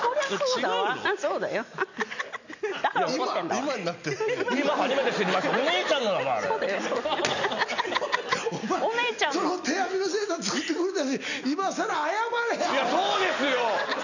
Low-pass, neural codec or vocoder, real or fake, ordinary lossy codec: 7.2 kHz; none; real; none